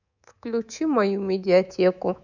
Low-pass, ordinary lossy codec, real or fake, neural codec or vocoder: 7.2 kHz; none; fake; codec, 24 kHz, 3.1 kbps, DualCodec